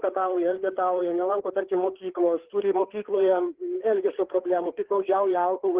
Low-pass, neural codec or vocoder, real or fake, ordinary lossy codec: 3.6 kHz; codec, 16 kHz, 4 kbps, FreqCodec, larger model; fake; Opus, 16 kbps